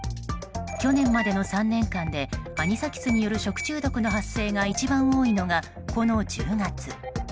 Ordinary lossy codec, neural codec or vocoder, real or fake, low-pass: none; none; real; none